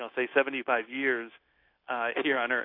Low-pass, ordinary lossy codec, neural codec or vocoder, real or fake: 5.4 kHz; AAC, 32 kbps; codec, 16 kHz, 0.9 kbps, LongCat-Audio-Codec; fake